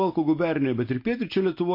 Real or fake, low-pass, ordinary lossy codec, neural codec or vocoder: real; 5.4 kHz; MP3, 32 kbps; none